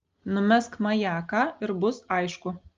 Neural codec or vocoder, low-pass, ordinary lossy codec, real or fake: none; 7.2 kHz; Opus, 32 kbps; real